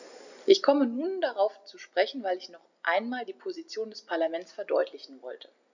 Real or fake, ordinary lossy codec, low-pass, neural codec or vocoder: real; none; 7.2 kHz; none